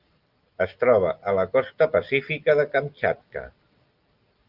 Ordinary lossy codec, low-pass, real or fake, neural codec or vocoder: Opus, 24 kbps; 5.4 kHz; real; none